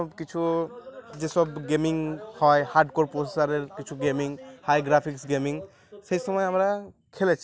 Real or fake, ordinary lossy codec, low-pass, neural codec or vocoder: real; none; none; none